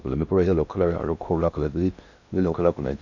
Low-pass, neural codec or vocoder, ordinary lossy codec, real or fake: 7.2 kHz; codec, 16 kHz in and 24 kHz out, 0.6 kbps, FocalCodec, streaming, 4096 codes; none; fake